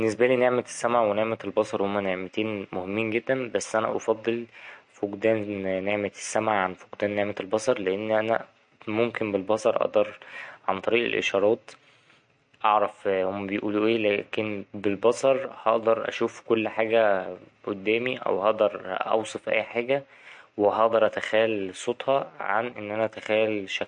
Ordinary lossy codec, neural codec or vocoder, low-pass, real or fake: MP3, 48 kbps; none; 9.9 kHz; real